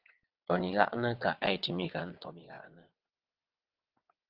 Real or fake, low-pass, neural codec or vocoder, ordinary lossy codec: fake; 5.4 kHz; vocoder, 22.05 kHz, 80 mel bands, WaveNeXt; Opus, 32 kbps